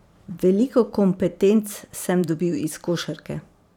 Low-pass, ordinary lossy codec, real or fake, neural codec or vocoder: 19.8 kHz; none; fake; vocoder, 44.1 kHz, 128 mel bands every 512 samples, BigVGAN v2